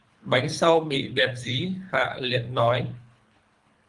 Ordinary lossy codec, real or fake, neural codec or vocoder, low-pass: Opus, 32 kbps; fake; codec, 24 kHz, 3 kbps, HILCodec; 10.8 kHz